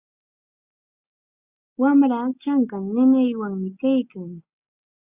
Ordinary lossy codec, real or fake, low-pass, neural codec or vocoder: Opus, 64 kbps; real; 3.6 kHz; none